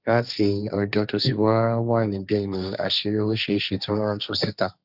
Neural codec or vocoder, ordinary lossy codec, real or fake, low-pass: codec, 16 kHz, 1.1 kbps, Voila-Tokenizer; none; fake; 5.4 kHz